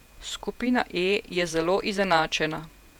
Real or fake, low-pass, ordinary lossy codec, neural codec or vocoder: fake; 19.8 kHz; none; vocoder, 48 kHz, 128 mel bands, Vocos